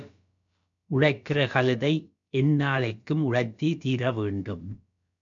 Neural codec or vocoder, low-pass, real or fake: codec, 16 kHz, about 1 kbps, DyCAST, with the encoder's durations; 7.2 kHz; fake